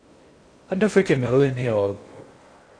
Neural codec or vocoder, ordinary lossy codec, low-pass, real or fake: codec, 16 kHz in and 24 kHz out, 0.6 kbps, FocalCodec, streaming, 2048 codes; AAC, 48 kbps; 9.9 kHz; fake